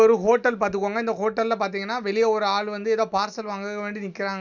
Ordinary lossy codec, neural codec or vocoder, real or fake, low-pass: none; none; real; 7.2 kHz